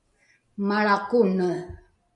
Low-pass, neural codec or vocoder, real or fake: 10.8 kHz; none; real